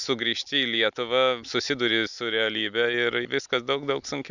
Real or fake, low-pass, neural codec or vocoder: real; 7.2 kHz; none